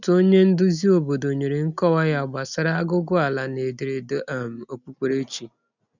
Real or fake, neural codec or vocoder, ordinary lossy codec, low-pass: real; none; none; 7.2 kHz